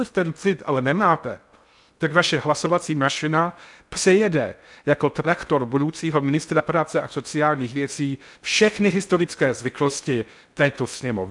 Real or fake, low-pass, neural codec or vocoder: fake; 10.8 kHz; codec, 16 kHz in and 24 kHz out, 0.6 kbps, FocalCodec, streaming, 2048 codes